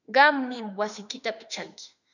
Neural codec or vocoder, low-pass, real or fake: autoencoder, 48 kHz, 32 numbers a frame, DAC-VAE, trained on Japanese speech; 7.2 kHz; fake